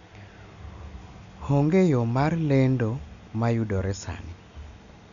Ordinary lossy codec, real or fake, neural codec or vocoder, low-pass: none; real; none; 7.2 kHz